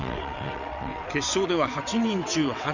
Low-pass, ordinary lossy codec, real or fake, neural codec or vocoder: 7.2 kHz; none; fake; vocoder, 22.05 kHz, 80 mel bands, WaveNeXt